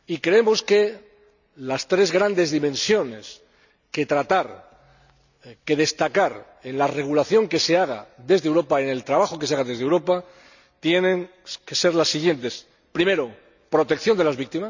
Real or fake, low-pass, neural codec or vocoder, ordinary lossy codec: real; 7.2 kHz; none; none